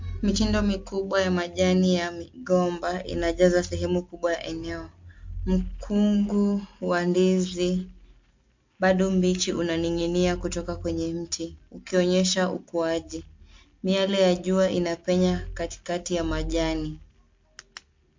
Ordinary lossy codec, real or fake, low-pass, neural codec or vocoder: MP3, 48 kbps; real; 7.2 kHz; none